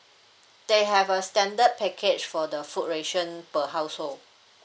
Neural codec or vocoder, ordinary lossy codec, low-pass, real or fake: none; none; none; real